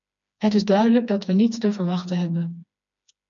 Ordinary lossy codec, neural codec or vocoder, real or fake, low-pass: MP3, 96 kbps; codec, 16 kHz, 2 kbps, FreqCodec, smaller model; fake; 7.2 kHz